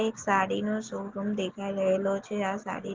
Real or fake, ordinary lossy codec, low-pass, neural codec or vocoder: real; Opus, 16 kbps; 7.2 kHz; none